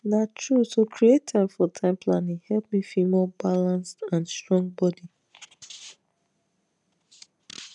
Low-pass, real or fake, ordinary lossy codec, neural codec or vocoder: 10.8 kHz; real; none; none